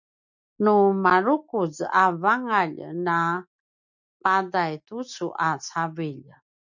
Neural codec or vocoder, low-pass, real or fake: none; 7.2 kHz; real